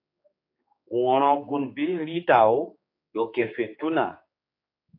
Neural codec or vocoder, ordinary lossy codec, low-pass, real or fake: codec, 16 kHz, 4 kbps, X-Codec, HuBERT features, trained on general audio; AAC, 32 kbps; 5.4 kHz; fake